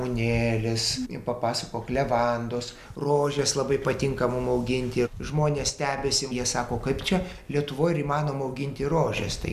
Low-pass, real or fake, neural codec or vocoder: 14.4 kHz; real; none